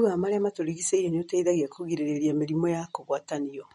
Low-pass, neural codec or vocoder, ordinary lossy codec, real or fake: 19.8 kHz; none; MP3, 48 kbps; real